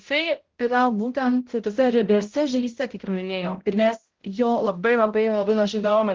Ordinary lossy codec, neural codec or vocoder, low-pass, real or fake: Opus, 16 kbps; codec, 16 kHz, 0.5 kbps, X-Codec, HuBERT features, trained on balanced general audio; 7.2 kHz; fake